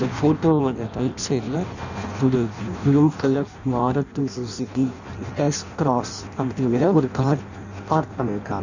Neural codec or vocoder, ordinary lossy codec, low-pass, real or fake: codec, 16 kHz in and 24 kHz out, 0.6 kbps, FireRedTTS-2 codec; none; 7.2 kHz; fake